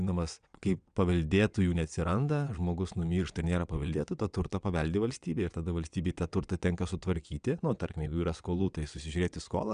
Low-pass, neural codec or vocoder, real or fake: 9.9 kHz; vocoder, 22.05 kHz, 80 mel bands, WaveNeXt; fake